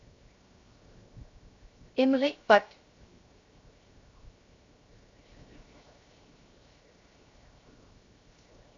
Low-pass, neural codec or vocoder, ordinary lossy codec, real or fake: 7.2 kHz; codec, 16 kHz, 0.7 kbps, FocalCodec; AAC, 64 kbps; fake